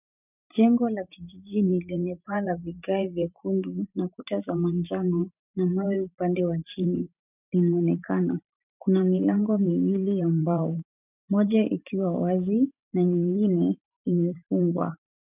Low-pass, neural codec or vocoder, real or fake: 3.6 kHz; vocoder, 44.1 kHz, 128 mel bands every 512 samples, BigVGAN v2; fake